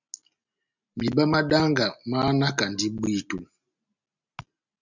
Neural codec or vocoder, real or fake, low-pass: none; real; 7.2 kHz